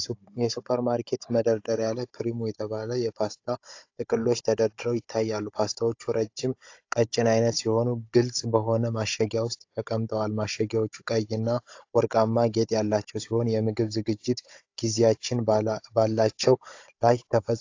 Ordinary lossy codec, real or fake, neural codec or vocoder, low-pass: AAC, 48 kbps; fake; codec, 16 kHz, 16 kbps, FunCodec, trained on Chinese and English, 50 frames a second; 7.2 kHz